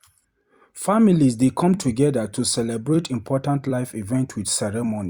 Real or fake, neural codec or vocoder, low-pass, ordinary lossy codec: fake; vocoder, 44.1 kHz, 128 mel bands every 256 samples, BigVGAN v2; 19.8 kHz; Opus, 64 kbps